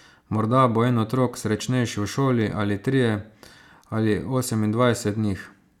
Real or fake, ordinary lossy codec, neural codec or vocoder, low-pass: real; none; none; 19.8 kHz